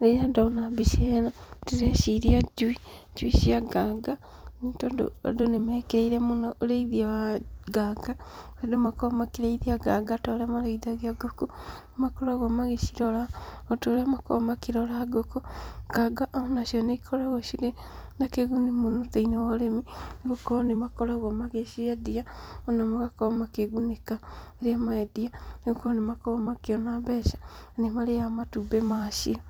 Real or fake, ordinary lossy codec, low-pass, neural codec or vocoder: fake; none; none; vocoder, 44.1 kHz, 128 mel bands every 256 samples, BigVGAN v2